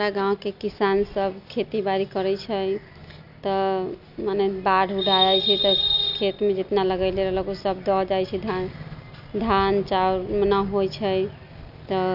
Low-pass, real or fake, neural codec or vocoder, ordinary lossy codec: 5.4 kHz; real; none; none